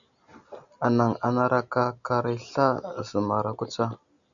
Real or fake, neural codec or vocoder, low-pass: real; none; 7.2 kHz